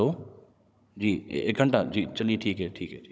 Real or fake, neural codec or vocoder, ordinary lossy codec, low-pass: fake; codec, 16 kHz, 16 kbps, FunCodec, trained on Chinese and English, 50 frames a second; none; none